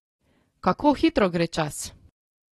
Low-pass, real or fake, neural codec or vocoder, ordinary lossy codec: 19.8 kHz; real; none; AAC, 32 kbps